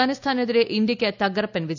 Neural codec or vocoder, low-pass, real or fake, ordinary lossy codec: none; 7.2 kHz; real; none